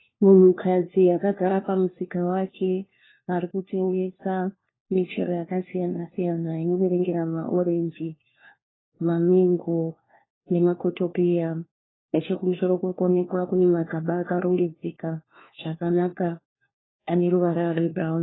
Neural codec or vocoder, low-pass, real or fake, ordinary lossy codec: codec, 16 kHz, 1 kbps, FunCodec, trained on LibriTTS, 50 frames a second; 7.2 kHz; fake; AAC, 16 kbps